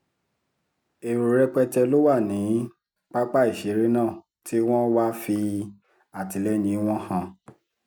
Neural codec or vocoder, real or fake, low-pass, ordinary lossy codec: none; real; 19.8 kHz; none